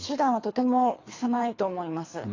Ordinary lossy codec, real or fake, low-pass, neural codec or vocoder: AAC, 32 kbps; fake; 7.2 kHz; codec, 24 kHz, 3 kbps, HILCodec